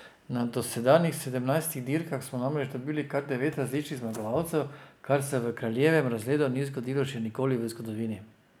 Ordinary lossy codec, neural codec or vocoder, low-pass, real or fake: none; none; none; real